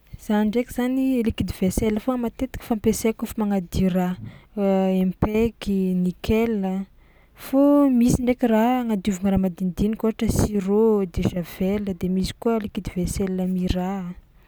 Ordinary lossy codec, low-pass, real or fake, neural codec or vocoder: none; none; real; none